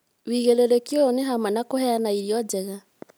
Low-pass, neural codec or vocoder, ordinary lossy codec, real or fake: none; none; none; real